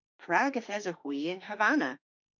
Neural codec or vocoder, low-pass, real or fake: autoencoder, 48 kHz, 32 numbers a frame, DAC-VAE, trained on Japanese speech; 7.2 kHz; fake